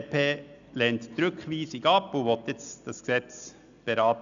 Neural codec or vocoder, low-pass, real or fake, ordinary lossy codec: none; 7.2 kHz; real; none